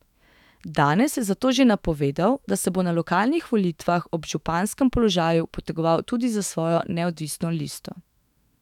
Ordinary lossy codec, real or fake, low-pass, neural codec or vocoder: none; fake; 19.8 kHz; autoencoder, 48 kHz, 128 numbers a frame, DAC-VAE, trained on Japanese speech